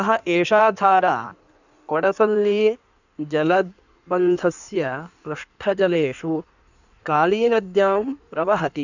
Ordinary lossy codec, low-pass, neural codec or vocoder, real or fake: none; 7.2 kHz; codec, 16 kHz in and 24 kHz out, 1.1 kbps, FireRedTTS-2 codec; fake